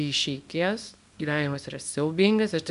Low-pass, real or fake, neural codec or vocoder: 10.8 kHz; fake; codec, 24 kHz, 0.9 kbps, WavTokenizer, small release